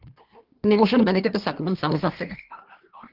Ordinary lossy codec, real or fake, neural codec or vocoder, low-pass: Opus, 16 kbps; fake; codec, 24 kHz, 1 kbps, SNAC; 5.4 kHz